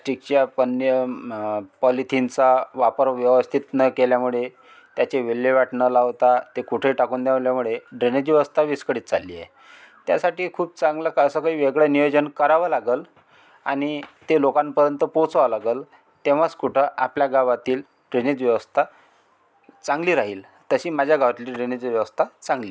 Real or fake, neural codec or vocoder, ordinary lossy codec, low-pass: real; none; none; none